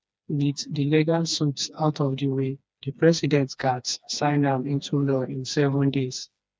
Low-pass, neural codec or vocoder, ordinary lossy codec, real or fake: none; codec, 16 kHz, 2 kbps, FreqCodec, smaller model; none; fake